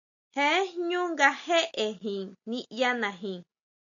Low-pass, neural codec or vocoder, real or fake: 7.2 kHz; none; real